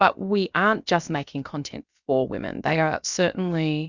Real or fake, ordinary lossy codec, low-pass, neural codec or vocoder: fake; Opus, 64 kbps; 7.2 kHz; codec, 16 kHz, about 1 kbps, DyCAST, with the encoder's durations